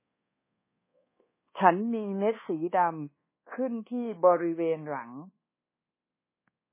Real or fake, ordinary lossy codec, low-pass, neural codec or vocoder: fake; MP3, 16 kbps; 3.6 kHz; codec, 24 kHz, 1.2 kbps, DualCodec